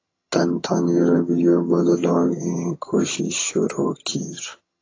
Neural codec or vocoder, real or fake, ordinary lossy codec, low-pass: vocoder, 22.05 kHz, 80 mel bands, HiFi-GAN; fake; AAC, 32 kbps; 7.2 kHz